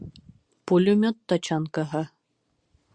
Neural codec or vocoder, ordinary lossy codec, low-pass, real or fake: none; Opus, 64 kbps; 9.9 kHz; real